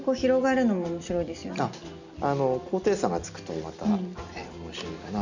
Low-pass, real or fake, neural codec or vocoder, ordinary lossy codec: 7.2 kHz; real; none; none